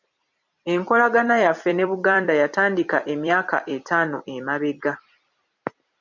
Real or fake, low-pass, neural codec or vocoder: fake; 7.2 kHz; vocoder, 44.1 kHz, 128 mel bands every 256 samples, BigVGAN v2